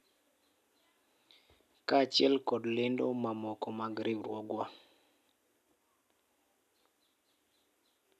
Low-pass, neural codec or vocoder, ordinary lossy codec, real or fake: 14.4 kHz; none; none; real